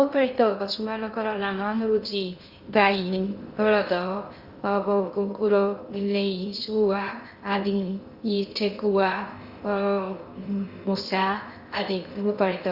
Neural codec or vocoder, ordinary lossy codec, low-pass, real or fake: codec, 16 kHz in and 24 kHz out, 0.6 kbps, FocalCodec, streaming, 2048 codes; Opus, 64 kbps; 5.4 kHz; fake